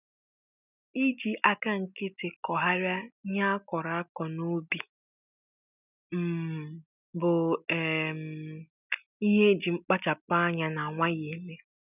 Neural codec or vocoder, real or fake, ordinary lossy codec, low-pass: none; real; none; 3.6 kHz